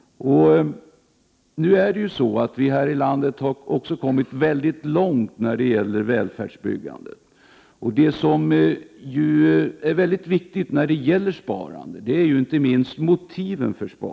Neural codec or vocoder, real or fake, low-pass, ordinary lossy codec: none; real; none; none